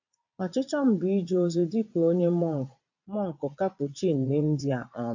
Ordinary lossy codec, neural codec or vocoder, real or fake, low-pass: AAC, 48 kbps; vocoder, 22.05 kHz, 80 mel bands, Vocos; fake; 7.2 kHz